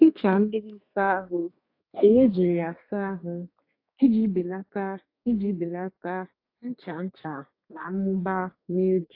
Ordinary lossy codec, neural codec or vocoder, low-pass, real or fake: AAC, 32 kbps; codec, 32 kHz, 1.9 kbps, SNAC; 5.4 kHz; fake